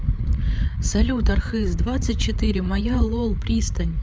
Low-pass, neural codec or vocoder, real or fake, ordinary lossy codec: none; codec, 16 kHz, 8 kbps, FreqCodec, larger model; fake; none